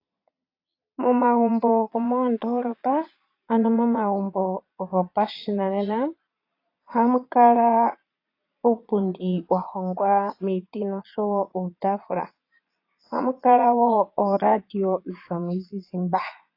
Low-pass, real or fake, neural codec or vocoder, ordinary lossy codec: 5.4 kHz; fake; vocoder, 22.05 kHz, 80 mel bands, Vocos; AAC, 32 kbps